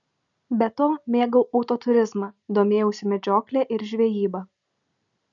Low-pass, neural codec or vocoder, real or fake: 7.2 kHz; none; real